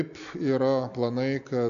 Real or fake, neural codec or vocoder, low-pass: real; none; 7.2 kHz